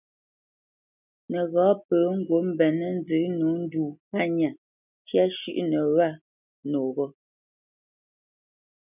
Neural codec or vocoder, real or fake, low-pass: none; real; 3.6 kHz